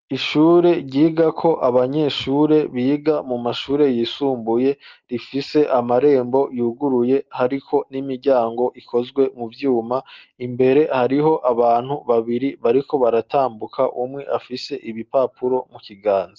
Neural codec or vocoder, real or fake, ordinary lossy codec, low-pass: none; real; Opus, 32 kbps; 7.2 kHz